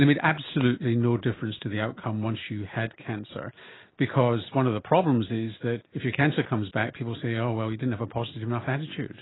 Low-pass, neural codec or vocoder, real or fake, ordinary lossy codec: 7.2 kHz; none; real; AAC, 16 kbps